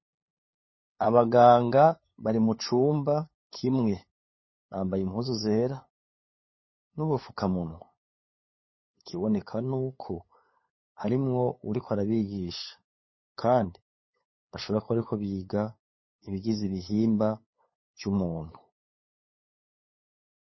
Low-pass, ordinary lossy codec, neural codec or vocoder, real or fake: 7.2 kHz; MP3, 24 kbps; codec, 16 kHz, 8 kbps, FunCodec, trained on LibriTTS, 25 frames a second; fake